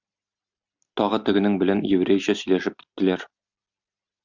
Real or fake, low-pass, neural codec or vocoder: real; 7.2 kHz; none